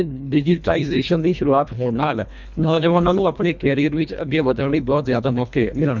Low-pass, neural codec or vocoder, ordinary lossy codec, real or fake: 7.2 kHz; codec, 24 kHz, 1.5 kbps, HILCodec; none; fake